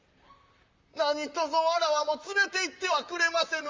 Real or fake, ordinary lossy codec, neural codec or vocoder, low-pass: fake; Opus, 32 kbps; vocoder, 44.1 kHz, 128 mel bands, Pupu-Vocoder; 7.2 kHz